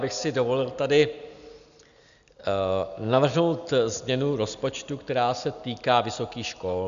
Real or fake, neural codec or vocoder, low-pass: real; none; 7.2 kHz